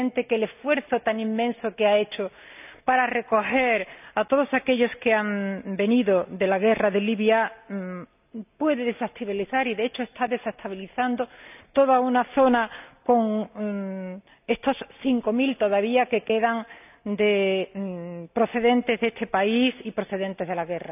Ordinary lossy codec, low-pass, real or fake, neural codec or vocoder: none; 3.6 kHz; real; none